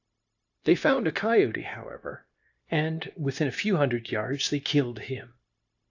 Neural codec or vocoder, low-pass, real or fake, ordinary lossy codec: codec, 16 kHz, 0.9 kbps, LongCat-Audio-Codec; 7.2 kHz; fake; AAC, 48 kbps